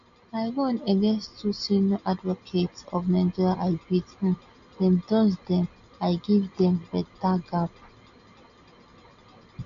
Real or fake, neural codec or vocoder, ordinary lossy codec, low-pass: real; none; none; 7.2 kHz